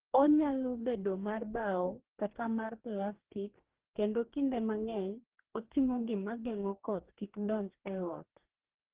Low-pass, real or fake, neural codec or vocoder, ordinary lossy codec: 3.6 kHz; fake; codec, 44.1 kHz, 2.6 kbps, DAC; Opus, 16 kbps